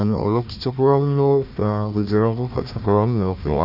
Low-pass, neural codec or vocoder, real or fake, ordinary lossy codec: 5.4 kHz; codec, 16 kHz, 1 kbps, FunCodec, trained on Chinese and English, 50 frames a second; fake; Opus, 64 kbps